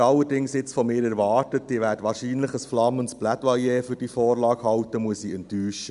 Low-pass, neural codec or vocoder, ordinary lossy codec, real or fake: 10.8 kHz; none; none; real